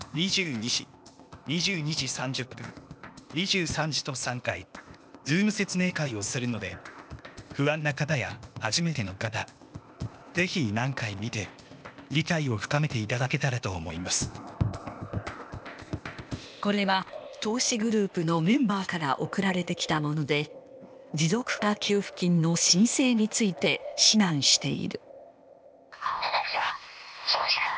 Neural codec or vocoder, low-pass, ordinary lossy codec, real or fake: codec, 16 kHz, 0.8 kbps, ZipCodec; none; none; fake